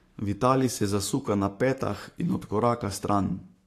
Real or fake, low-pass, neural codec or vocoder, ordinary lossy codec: fake; 14.4 kHz; codec, 44.1 kHz, 7.8 kbps, Pupu-Codec; AAC, 64 kbps